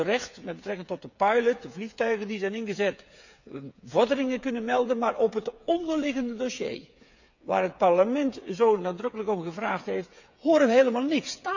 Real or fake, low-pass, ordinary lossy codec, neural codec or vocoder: fake; 7.2 kHz; none; codec, 16 kHz, 8 kbps, FreqCodec, smaller model